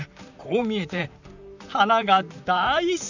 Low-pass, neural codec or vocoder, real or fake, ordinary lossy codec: 7.2 kHz; vocoder, 44.1 kHz, 128 mel bands, Pupu-Vocoder; fake; none